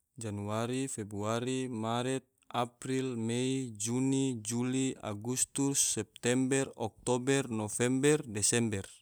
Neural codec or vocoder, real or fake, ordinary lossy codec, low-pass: none; real; none; none